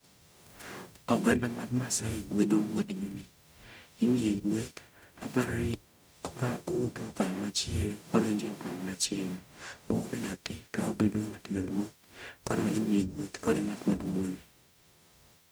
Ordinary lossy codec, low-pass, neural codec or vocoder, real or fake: none; none; codec, 44.1 kHz, 0.9 kbps, DAC; fake